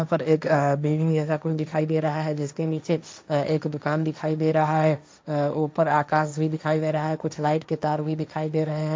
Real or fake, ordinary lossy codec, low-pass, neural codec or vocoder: fake; none; none; codec, 16 kHz, 1.1 kbps, Voila-Tokenizer